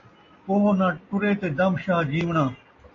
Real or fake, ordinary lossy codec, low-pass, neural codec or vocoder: real; AAC, 32 kbps; 7.2 kHz; none